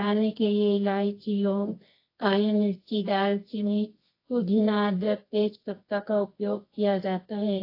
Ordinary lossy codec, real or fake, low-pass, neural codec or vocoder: AAC, 32 kbps; fake; 5.4 kHz; codec, 24 kHz, 0.9 kbps, WavTokenizer, medium music audio release